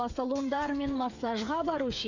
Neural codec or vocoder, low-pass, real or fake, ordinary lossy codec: codec, 16 kHz, 8 kbps, FreqCodec, smaller model; 7.2 kHz; fake; none